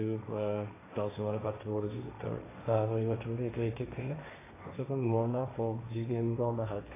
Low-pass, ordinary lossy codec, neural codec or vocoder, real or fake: 3.6 kHz; AAC, 16 kbps; codec, 16 kHz, 1.1 kbps, Voila-Tokenizer; fake